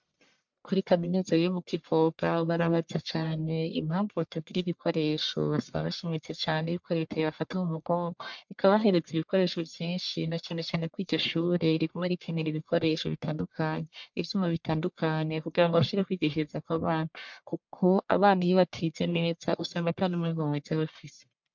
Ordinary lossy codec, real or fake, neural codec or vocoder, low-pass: MP3, 64 kbps; fake; codec, 44.1 kHz, 1.7 kbps, Pupu-Codec; 7.2 kHz